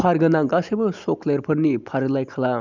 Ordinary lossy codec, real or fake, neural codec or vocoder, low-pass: none; real; none; 7.2 kHz